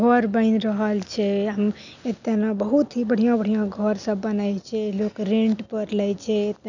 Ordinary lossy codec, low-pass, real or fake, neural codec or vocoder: none; 7.2 kHz; real; none